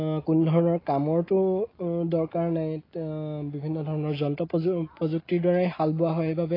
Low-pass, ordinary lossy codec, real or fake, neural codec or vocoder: 5.4 kHz; AAC, 24 kbps; real; none